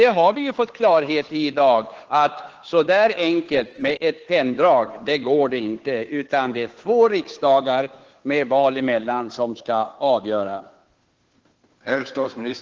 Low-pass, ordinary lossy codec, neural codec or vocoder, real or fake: 7.2 kHz; Opus, 16 kbps; codec, 16 kHz, 2 kbps, FunCodec, trained on Chinese and English, 25 frames a second; fake